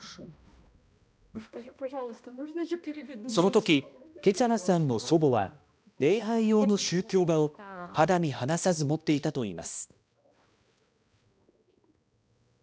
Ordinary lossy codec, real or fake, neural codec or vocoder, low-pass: none; fake; codec, 16 kHz, 1 kbps, X-Codec, HuBERT features, trained on balanced general audio; none